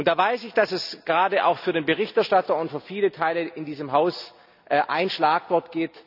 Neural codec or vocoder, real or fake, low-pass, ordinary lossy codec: none; real; 5.4 kHz; none